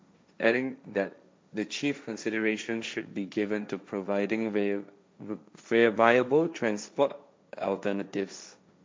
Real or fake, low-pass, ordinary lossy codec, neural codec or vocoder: fake; none; none; codec, 16 kHz, 1.1 kbps, Voila-Tokenizer